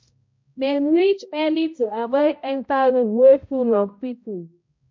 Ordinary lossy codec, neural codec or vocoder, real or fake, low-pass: MP3, 48 kbps; codec, 16 kHz, 0.5 kbps, X-Codec, HuBERT features, trained on balanced general audio; fake; 7.2 kHz